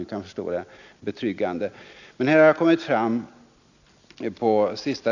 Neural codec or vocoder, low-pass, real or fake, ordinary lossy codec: none; 7.2 kHz; real; none